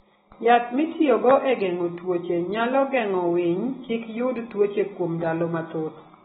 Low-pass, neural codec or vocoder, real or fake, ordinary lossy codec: 7.2 kHz; none; real; AAC, 16 kbps